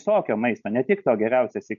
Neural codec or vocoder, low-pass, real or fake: none; 7.2 kHz; real